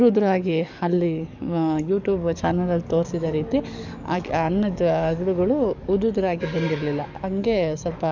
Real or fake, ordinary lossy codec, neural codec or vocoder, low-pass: fake; none; codec, 44.1 kHz, 7.8 kbps, DAC; 7.2 kHz